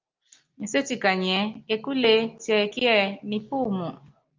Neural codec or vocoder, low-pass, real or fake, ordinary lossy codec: none; 7.2 kHz; real; Opus, 24 kbps